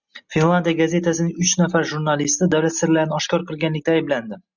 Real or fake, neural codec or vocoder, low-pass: real; none; 7.2 kHz